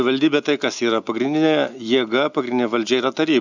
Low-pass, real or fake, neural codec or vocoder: 7.2 kHz; real; none